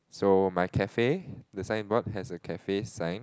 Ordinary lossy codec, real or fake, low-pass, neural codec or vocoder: none; real; none; none